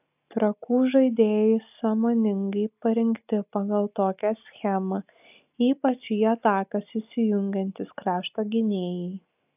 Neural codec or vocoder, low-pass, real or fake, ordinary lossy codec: none; 3.6 kHz; real; AAC, 32 kbps